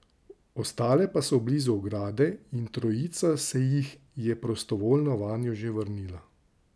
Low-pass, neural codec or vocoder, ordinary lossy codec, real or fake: none; none; none; real